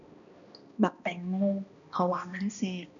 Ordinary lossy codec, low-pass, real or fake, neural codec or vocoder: none; 7.2 kHz; fake; codec, 16 kHz, 1 kbps, X-Codec, HuBERT features, trained on balanced general audio